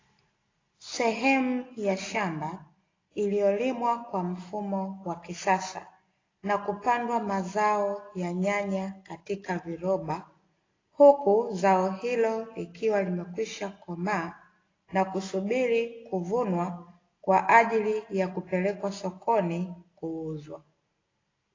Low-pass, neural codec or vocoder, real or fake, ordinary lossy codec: 7.2 kHz; none; real; AAC, 32 kbps